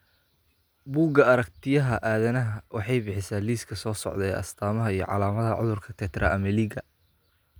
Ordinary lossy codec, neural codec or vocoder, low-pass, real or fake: none; none; none; real